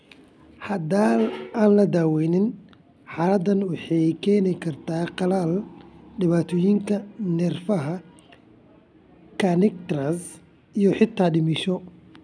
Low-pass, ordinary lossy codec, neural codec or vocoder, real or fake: none; none; none; real